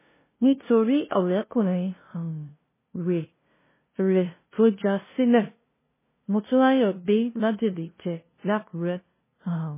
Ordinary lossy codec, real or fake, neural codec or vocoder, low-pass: MP3, 16 kbps; fake; codec, 16 kHz, 0.5 kbps, FunCodec, trained on LibriTTS, 25 frames a second; 3.6 kHz